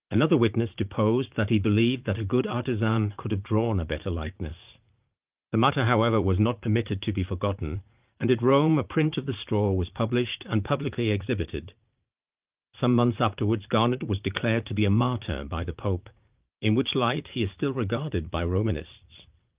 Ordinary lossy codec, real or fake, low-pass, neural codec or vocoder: Opus, 32 kbps; fake; 3.6 kHz; vocoder, 44.1 kHz, 80 mel bands, Vocos